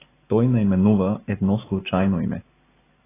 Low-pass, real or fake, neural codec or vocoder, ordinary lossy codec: 3.6 kHz; real; none; AAC, 16 kbps